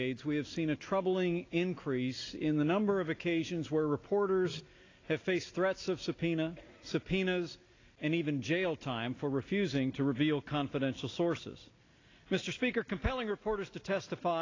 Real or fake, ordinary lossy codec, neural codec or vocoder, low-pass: real; AAC, 32 kbps; none; 7.2 kHz